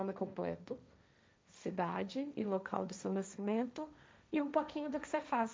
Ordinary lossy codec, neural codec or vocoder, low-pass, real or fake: none; codec, 16 kHz, 1.1 kbps, Voila-Tokenizer; none; fake